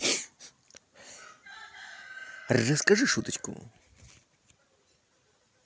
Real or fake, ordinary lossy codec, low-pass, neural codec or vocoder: real; none; none; none